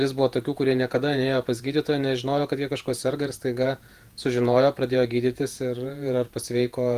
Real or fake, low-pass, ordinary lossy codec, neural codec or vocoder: fake; 14.4 kHz; Opus, 24 kbps; vocoder, 48 kHz, 128 mel bands, Vocos